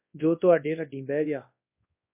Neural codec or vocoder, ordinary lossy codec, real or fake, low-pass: codec, 24 kHz, 0.9 kbps, WavTokenizer, large speech release; MP3, 24 kbps; fake; 3.6 kHz